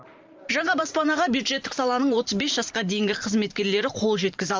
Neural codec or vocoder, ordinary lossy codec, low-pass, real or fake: vocoder, 44.1 kHz, 128 mel bands every 512 samples, BigVGAN v2; Opus, 32 kbps; 7.2 kHz; fake